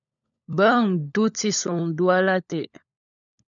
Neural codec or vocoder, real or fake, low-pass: codec, 16 kHz, 16 kbps, FunCodec, trained on LibriTTS, 50 frames a second; fake; 7.2 kHz